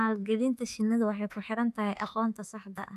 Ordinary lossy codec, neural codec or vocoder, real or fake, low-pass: none; autoencoder, 48 kHz, 32 numbers a frame, DAC-VAE, trained on Japanese speech; fake; 14.4 kHz